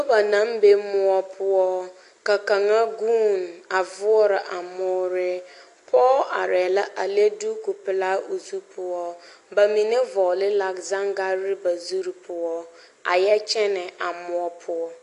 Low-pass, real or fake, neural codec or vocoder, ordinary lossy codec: 10.8 kHz; real; none; AAC, 48 kbps